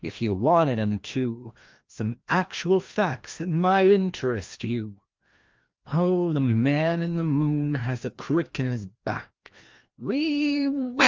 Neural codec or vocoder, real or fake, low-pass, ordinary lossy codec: codec, 16 kHz, 1 kbps, FreqCodec, larger model; fake; 7.2 kHz; Opus, 32 kbps